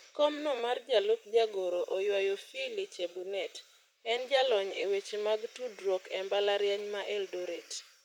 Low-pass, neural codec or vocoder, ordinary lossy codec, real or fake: 19.8 kHz; vocoder, 44.1 kHz, 128 mel bands, Pupu-Vocoder; none; fake